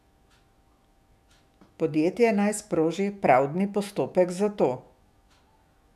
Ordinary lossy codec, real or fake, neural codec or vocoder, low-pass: none; fake; autoencoder, 48 kHz, 128 numbers a frame, DAC-VAE, trained on Japanese speech; 14.4 kHz